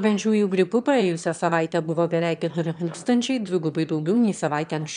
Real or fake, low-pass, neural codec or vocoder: fake; 9.9 kHz; autoencoder, 22.05 kHz, a latent of 192 numbers a frame, VITS, trained on one speaker